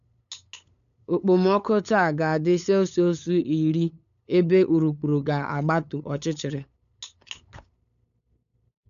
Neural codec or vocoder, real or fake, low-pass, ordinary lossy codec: codec, 16 kHz, 8 kbps, FunCodec, trained on LibriTTS, 25 frames a second; fake; 7.2 kHz; none